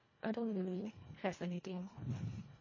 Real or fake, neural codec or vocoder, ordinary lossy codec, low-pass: fake; codec, 24 kHz, 1.5 kbps, HILCodec; MP3, 32 kbps; 7.2 kHz